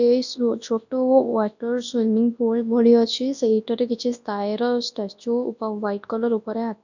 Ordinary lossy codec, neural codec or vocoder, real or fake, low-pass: none; codec, 24 kHz, 0.9 kbps, WavTokenizer, large speech release; fake; 7.2 kHz